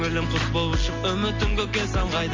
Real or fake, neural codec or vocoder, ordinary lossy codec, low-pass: real; none; MP3, 48 kbps; 7.2 kHz